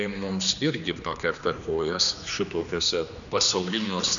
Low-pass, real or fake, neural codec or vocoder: 7.2 kHz; fake; codec, 16 kHz, 2 kbps, X-Codec, HuBERT features, trained on general audio